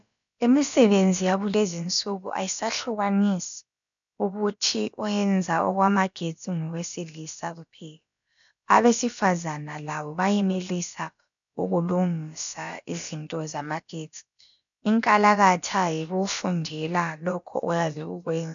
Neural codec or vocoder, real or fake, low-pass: codec, 16 kHz, about 1 kbps, DyCAST, with the encoder's durations; fake; 7.2 kHz